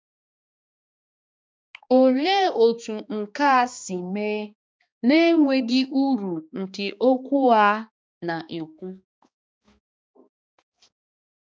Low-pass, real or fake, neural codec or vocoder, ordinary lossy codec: none; fake; codec, 16 kHz, 2 kbps, X-Codec, HuBERT features, trained on balanced general audio; none